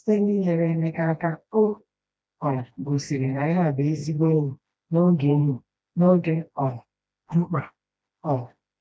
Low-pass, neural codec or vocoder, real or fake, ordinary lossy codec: none; codec, 16 kHz, 1 kbps, FreqCodec, smaller model; fake; none